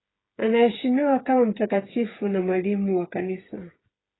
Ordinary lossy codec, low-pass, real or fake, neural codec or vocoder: AAC, 16 kbps; 7.2 kHz; fake; codec, 16 kHz, 8 kbps, FreqCodec, smaller model